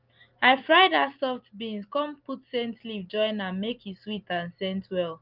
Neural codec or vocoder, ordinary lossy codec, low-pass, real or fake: none; Opus, 24 kbps; 5.4 kHz; real